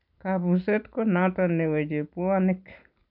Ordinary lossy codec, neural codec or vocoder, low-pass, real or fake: none; none; 5.4 kHz; real